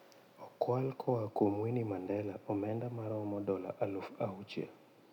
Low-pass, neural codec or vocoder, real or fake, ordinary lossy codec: 19.8 kHz; none; real; none